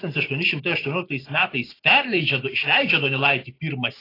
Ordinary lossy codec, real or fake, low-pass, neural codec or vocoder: AAC, 24 kbps; real; 5.4 kHz; none